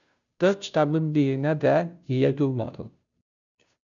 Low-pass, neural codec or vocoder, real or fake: 7.2 kHz; codec, 16 kHz, 0.5 kbps, FunCodec, trained on Chinese and English, 25 frames a second; fake